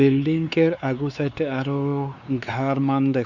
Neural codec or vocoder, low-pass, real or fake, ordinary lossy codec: codec, 16 kHz, 4 kbps, X-Codec, WavLM features, trained on Multilingual LibriSpeech; 7.2 kHz; fake; none